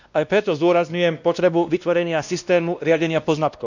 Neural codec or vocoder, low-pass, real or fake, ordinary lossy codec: codec, 16 kHz, 1 kbps, X-Codec, WavLM features, trained on Multilingual LibriSpeech; 7.2 kHz; fake; none